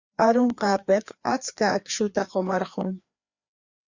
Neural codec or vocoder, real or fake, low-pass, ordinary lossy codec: codec, 16 kHz, 2 kbps, FreqCodec, larger model; fake; 7.2 kHz; Opus, 64 kbps